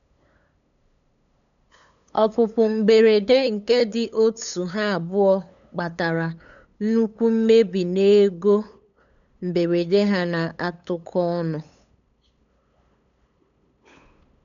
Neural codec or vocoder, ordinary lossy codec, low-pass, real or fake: codec, 16 kHz, 8 kbps, FunCodec, trained on LibriTTS, 25 frames a second; none; 7.2 kHz; fake